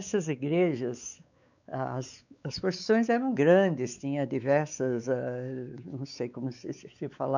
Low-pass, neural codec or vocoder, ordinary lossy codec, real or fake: 7.2 kHz; codec, 16 kHz, 4 kbps, X-Codec, WavLM features, trained on Multilingual LibriSpeech; none; fake